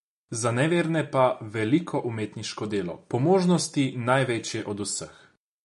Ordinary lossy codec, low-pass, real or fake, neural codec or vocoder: MP3, 48 kbps; 14.4 kHz; real; none